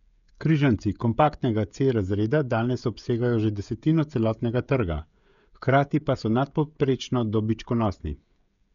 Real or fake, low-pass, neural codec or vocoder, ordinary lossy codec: fake; 7.2 kHz; codec, 16 kHz, 16 kbps, FreqCodec, smaller model; none